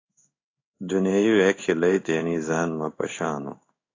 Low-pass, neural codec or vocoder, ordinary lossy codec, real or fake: 7.2 kHz; codec, 16 kHz in and 24 kHz out, 1 kbps, XY-Tokenizer; AAC, 32 kbps; fake